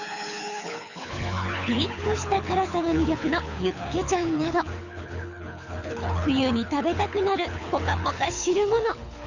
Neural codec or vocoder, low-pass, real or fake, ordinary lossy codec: codec, 24 kHz, 6 kbps, HILCodec; 7.2 kHz; fake; none